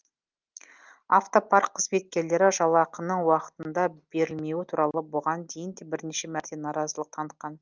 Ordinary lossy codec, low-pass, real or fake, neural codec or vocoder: Opus, 24 kbps; 7.2 kHz; real; none